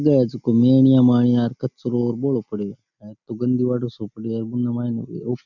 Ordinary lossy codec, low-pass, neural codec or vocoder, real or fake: none; 7.2 kHz; none; real